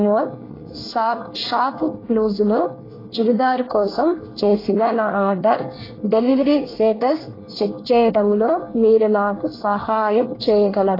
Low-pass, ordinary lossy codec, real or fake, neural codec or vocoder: 5.4 kHz; AAC, 24 kbps; fake; codec, 24 kHz, 1 kbps, SNAC